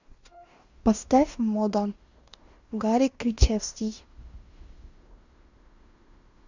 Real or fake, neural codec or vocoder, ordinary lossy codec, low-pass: fake; codec, 16 kHz in and 24 kHz out, 0.9 kbps, LongCat-Audio-Codec, fine tuned four codebook decoder; Opus, 64 kbps; 7.2 kHz